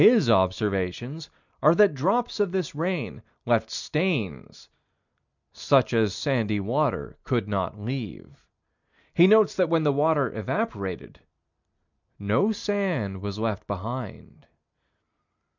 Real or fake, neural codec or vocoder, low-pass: real; none; 7.2 kHz